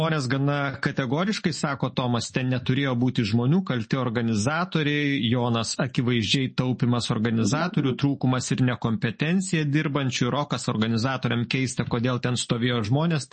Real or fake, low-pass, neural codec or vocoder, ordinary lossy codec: real; 10.8 kHz; none; MP3, 32 kbps